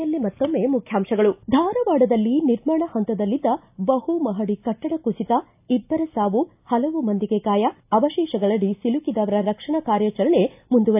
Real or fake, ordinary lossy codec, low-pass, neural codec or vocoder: real; AAC, 32 kbps; 3.6 kHz; none